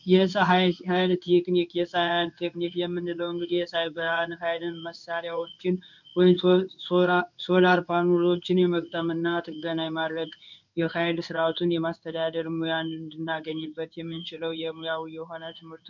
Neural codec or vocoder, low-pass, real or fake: codec, 16 kHz in and 24 kHz out, 1 kbps, XY-Tokenizer; 7.2 kHz; fake